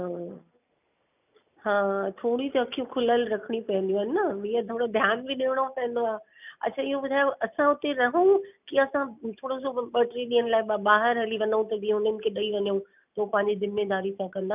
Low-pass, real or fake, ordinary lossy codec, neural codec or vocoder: 3.6 kHz; real; none; none